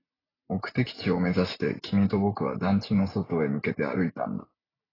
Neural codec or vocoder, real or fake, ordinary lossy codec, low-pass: none; real; AAC, 24 kbps; 5.4 kHz